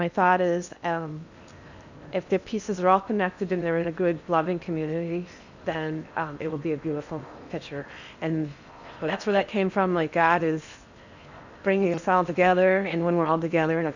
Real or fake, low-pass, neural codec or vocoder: fake; 7.2 kHz; codec, 16 kHz in and 24 kHz out, 0.8 kbps, FocalCodec, streaming, 65536 codes